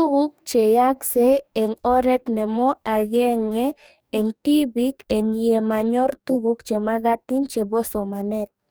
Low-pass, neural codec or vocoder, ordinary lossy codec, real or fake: none; codec, 44.1 kHz, 2.6 kbps, DAC; none; fake